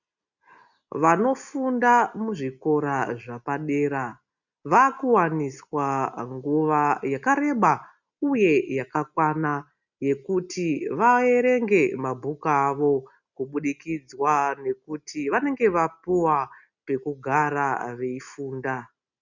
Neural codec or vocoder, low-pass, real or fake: none; 7.2 kHz; real